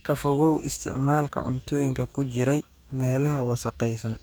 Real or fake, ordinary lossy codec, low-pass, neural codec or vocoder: fake; none; none; codec, 44.1 kHz, 2.6 kbps, DAC